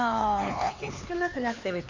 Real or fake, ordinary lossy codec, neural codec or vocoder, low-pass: fake; MP3, 48 kbps; codec, 16 kHz, 4 kbps, X-Codec, HuBERT features, trained on LibriSpeech; 7.2 kHz